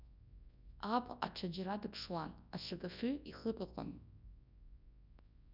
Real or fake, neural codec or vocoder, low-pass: fake; codec, 24 kHz, 0.9 kbps, WavTokenizer, large speech release; 5.4 kHz